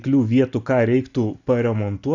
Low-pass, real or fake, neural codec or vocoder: 7.2 kHz; real; none